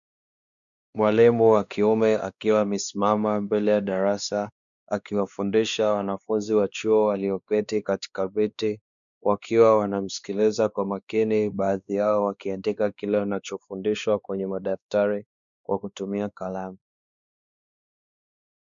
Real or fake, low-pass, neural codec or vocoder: fake; 7.2 kHz; codec, 16 kHz, 2 kbps, X-Codec, WavLM features, trained on Multilingual LibriSpeech